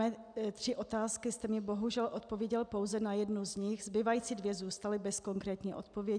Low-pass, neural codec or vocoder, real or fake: 9.9 kHz; none; real